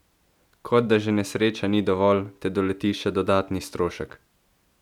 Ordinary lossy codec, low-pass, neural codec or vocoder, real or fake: none; 19.8 kHz; none; real